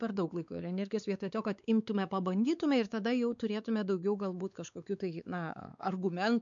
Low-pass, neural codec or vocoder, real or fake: 7.2 kHz; codec, 16 kHz, 4 kbps, X-Codec, WavLM features, trained on Multilingual LibriSpeech; fake